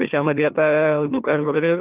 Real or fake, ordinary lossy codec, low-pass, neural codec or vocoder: fake; Opus, 32 kbps; 3.6 kHz; autoencoder, 44.1 kHz, a latent of 192 numbers a frame, MeloTTS